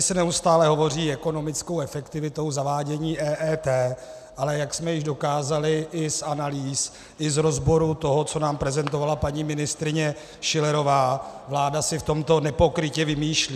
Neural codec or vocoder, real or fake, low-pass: none; real; 14.4 kHz